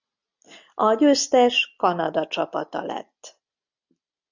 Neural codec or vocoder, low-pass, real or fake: none; 7.2 kHz; real